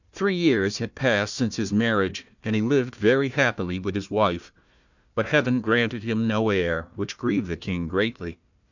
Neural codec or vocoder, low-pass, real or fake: codec, 16 kHz, 1 kbps, FunCodec, trained on Chinese and English, 50 frames a second; 7.2 kHz; fake